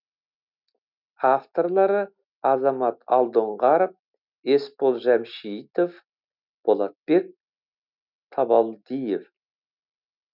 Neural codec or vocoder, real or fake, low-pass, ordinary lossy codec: none; real; 5.4 kHz; none